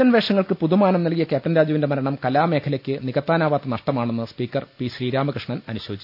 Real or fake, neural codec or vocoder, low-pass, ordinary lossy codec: real; none; 5.4 kHz; none